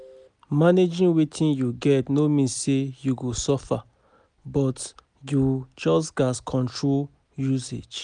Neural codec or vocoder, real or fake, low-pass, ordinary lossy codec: none; real; 9.9 kHz; none